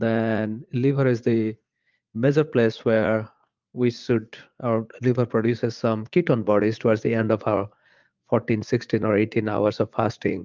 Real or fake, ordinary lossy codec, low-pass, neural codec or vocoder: fake; Opus, 24 kbps; 7.2 kHz; vocoder, 44.1 kHz, 80 mel bands, Vocos